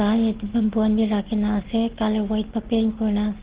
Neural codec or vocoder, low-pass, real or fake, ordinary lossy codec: none; 3.6 kHz; real; Opus, 16 kbps